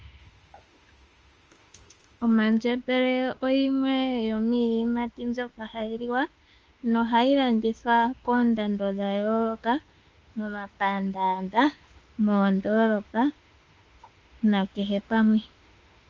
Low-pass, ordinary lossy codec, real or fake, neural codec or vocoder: 7.2 kHz; Opus, 24 kbps; fake; autoencoder, 48 kHz, 32 numbers a frame, DAC-VAE, trained on Japanese speech